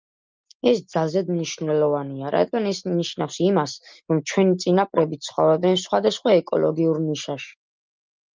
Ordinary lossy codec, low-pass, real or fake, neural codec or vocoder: Opus, 24 kbps; 7.2 kHz; real; none